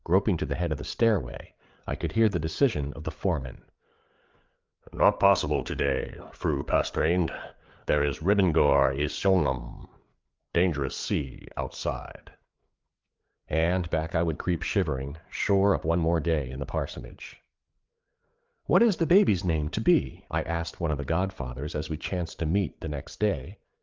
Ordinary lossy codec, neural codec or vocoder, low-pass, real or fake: Opus, 32 kbps; codec, 16 kHz, 8 kbps, FreqCodec, larger model; 7.2 kHz; fake